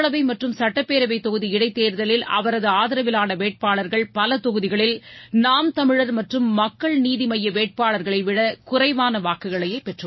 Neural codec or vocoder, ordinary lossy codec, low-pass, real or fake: none; MP3, 24 kbps; 7.2 kHz; real